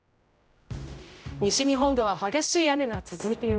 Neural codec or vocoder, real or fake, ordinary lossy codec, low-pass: codec, 16 kHz, 0.5 kbps, X-Codec, HuBERT features, trained on general audio; fake; none; none